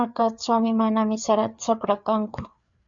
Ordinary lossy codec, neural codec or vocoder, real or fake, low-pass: Opus, 64 kbps; codec, 16 kHz, 4 kbps, FreqCodec, larger model; fake; 7.2 kHz